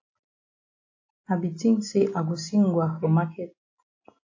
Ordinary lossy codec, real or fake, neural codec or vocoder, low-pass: AAC, 48 kbps; real; none; 7.2 kHz